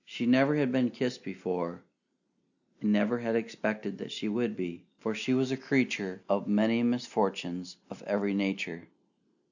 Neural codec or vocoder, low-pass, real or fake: none; 7.2 kHz; real